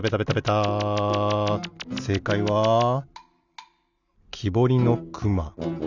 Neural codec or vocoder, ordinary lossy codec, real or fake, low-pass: none; none; real; 7.2 kHz